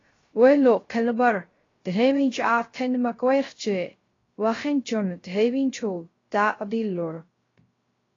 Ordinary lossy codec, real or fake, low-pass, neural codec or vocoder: AAC, 32 kbps; fake; 7.2 kHz; codec, 16 kHz, 0.3 kbps, FocalCodec